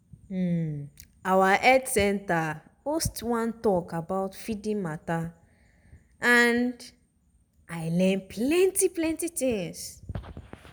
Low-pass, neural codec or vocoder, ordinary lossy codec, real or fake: none; none; none; real